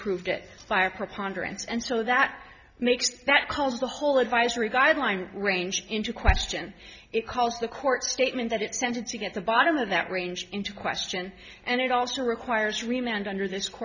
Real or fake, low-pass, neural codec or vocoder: real; 7.2 kHz; none